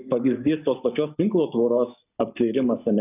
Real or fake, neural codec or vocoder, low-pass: fake; codec, 44.1 kHz, 7.8 kbps, Pupu-Codec; 3.6 kHz